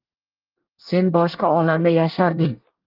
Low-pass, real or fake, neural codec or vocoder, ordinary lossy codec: 5.4 kHz; fake; codec, 24 kHz, 1 kbps, SNAC; Opus, 32 kbps